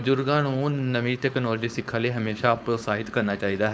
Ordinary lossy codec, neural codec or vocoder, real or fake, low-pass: none; codec, 16 kHz, 4.8 kbps, FACodec; fake; none